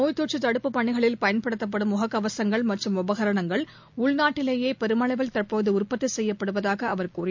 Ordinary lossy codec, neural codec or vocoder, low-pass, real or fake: MP3, 64 kbps; none; 7.2 kHz; real